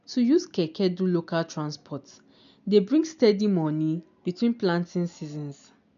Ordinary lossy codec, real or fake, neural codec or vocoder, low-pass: none; real; none; 7.2 kHz